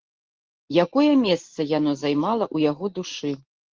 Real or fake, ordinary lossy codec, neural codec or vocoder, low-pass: real; Opus, 16 kbps; none; 7.2 kHz